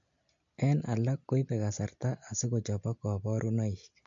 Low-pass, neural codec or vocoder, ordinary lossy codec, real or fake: 7.2 kHz; none; MP3, 48 kbps; real